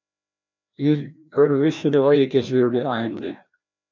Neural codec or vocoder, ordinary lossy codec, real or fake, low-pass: codec, 16 kHz, 1 kbps, FreqCodec, larger model; MP3, 64 kbps; fake; 7.2 kHz